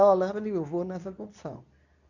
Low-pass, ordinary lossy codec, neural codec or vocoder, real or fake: 7.2 kHz; none; codec, 24 kHz, 0.9 kbps, WavTokenizer, medium speech release version 2; fake